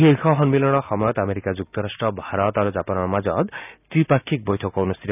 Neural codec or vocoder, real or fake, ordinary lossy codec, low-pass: none; real; none; 3.6 kHz